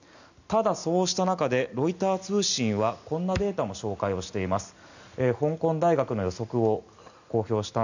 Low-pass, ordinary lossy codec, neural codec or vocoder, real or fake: 7.2 kHz; none; none; real